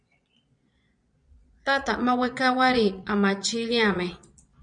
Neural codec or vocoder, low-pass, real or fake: vocoder, 22.05 kHz, 80 mel bands, Vocos; 9.9 kHz; fake